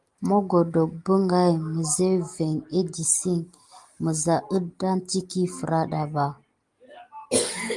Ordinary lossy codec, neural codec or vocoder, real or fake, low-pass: Opus, 24 kbps; none; real; 10.8 kHz